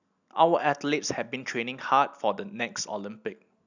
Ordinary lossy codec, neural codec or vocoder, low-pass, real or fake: none; none; 7.2 kHz; real